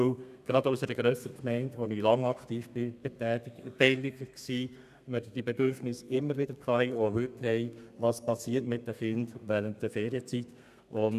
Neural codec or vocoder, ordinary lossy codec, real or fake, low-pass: codec, 32 kHz, 1.9 kbps, SNAC; none; fake; 14.4 kHz